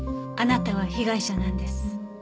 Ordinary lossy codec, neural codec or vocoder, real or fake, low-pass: none; none; real; none